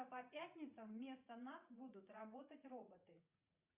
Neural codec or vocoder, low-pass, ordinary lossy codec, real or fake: vocoder, 44.1 kHz, 80 mel bands, Vocos; 3.6 kHz; Opus, 64 kbps; fake